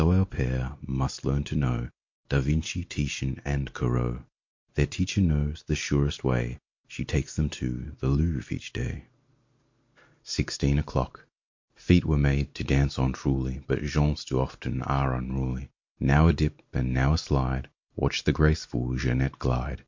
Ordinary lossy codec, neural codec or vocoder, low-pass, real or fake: MP3, 48 kbps; none; 7.2 kHz; real